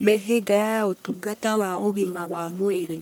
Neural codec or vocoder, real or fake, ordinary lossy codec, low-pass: codec, 44.1 kHz, 1.7 kbps, Pupu-Codec; fake; none; none